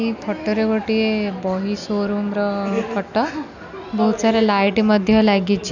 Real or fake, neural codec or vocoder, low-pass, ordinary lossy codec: real; none; 7.2 kHz; none